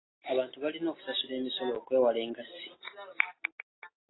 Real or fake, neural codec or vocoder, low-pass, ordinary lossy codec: real; none; 7.2 kHz; AAC, 16 kbps